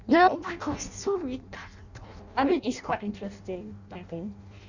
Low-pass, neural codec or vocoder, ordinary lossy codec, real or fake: 7.2 kHz; codec, 16 kHz in and 24 kHz out, 0.6 kbps, FireRedTTS-2 codec; none; fake